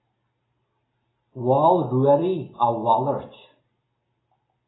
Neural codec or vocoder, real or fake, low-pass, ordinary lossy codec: none; real; 7.2 kHz; AAC, 16 kbps